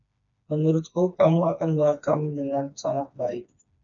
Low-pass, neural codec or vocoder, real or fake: 7.2 kHz; codec, 16 kHz, 2 kbps, FreqCodec, smaller model; fake